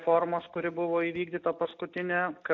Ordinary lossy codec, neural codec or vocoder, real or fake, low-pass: AAC, 48 kbps; none; real; 7.2 kHz